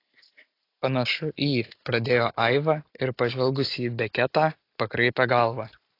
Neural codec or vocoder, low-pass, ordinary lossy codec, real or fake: none; 5.4 kHz; AAC, 32 kbps; real